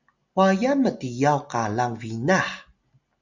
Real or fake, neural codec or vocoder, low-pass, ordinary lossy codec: real; none; 7.2 kHz; Opus, 64 kbps